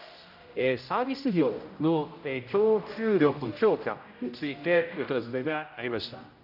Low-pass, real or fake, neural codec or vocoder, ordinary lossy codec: 5.4 kHz; fake; codec, 16 kHz, 0.5 kbps, X-Codec, HuBERT features, trained on general audio; none